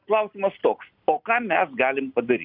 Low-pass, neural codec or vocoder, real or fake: 5.4 kHz; none; real